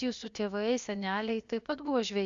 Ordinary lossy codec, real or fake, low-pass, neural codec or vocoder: Opus, 64 kbps; fake; 7.2 kHz; codec, 16 kHz, about 1 kbps, DyCAST, with the encoder's durations